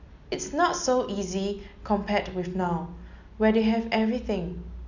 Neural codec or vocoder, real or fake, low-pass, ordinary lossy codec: none; real; 7.2 kHz; none